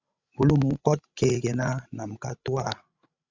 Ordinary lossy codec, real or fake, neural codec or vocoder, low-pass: Opus, 64 kbps; fake; codec, 16 kHz, 16 kbps, FreqCodec, larger model; 7.2 kHz